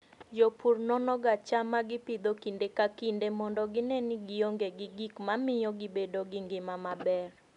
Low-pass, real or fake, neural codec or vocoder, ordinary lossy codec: 10.8 kHz; real; none; none